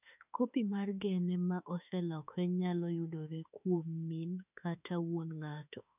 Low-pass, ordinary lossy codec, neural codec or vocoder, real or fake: 3.6 kHz; none; autoencoder, 48 kHz, 32 numbers a frame, DAC-VAE, trained on Japanese speech; fake